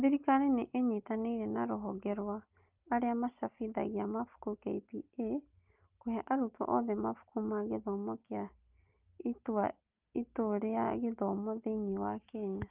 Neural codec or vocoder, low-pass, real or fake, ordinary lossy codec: none; 3.6 kHz; real; Opus, 24 kbps